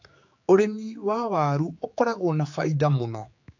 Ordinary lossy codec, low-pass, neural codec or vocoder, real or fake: AAC, 48 kbps; 7.2 kHz; codec, 16 kHz, 4 kbps, X-Codec, HuBERT features, trained on general audio; fake